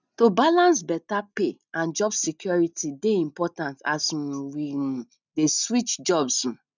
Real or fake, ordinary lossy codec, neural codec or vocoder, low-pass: real; none; none; 7.2 kHz